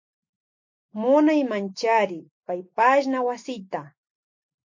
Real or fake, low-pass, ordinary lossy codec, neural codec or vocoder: real; 7.2 kHz; MP3, 48 kbps; none